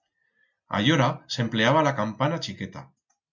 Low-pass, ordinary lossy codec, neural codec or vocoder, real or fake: 7.2 kHz; MP3, 64 kbps; none; real